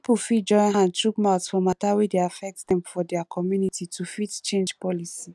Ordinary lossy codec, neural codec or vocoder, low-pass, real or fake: none; none; none; real